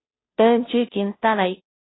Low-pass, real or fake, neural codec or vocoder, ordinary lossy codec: 7.2 kHz; fake; codec, 16 kHz, 0.5 kbps, FunCodec, trained on Chinese and English, 25 frames a second; AAC, 16 kbps